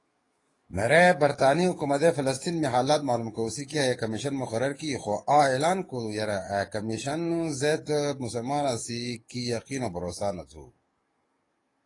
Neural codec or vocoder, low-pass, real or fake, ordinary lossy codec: codec, 44.1 kHz, 7.8 kbps, DAC; 10.8 kHz; fake; AAC, 32 kbps